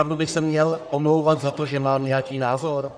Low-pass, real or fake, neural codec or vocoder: 9.9 kHz; fake; codec, 44.1 kHz, 1.7 kbps, Pupu-Codec